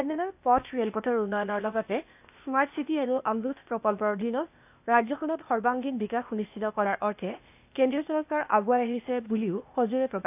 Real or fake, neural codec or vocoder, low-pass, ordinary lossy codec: fake; codec, 16 kHz, 0.8 kbps, ZipCodec; 3.6 kHz; AAC, 32 kbps